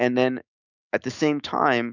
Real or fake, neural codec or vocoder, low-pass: fake; autoencoder, 48 kHz, 128 numbers a frame, DAC-VAE, trained on Japanese speech; 7.2 kHz